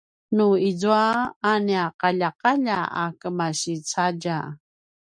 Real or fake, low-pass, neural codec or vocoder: real; 9.9 kHz; none